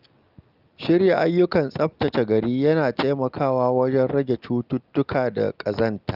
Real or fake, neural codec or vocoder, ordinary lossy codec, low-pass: real; none; Opus, 24 kbps; 5.4 kHz